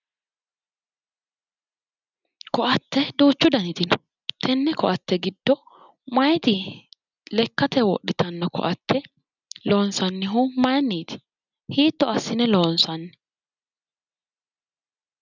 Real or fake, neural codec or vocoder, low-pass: real; none; 7.2 kHz